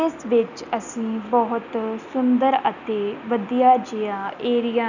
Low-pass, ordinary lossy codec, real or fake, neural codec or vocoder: 7.2 kHz; none; real; none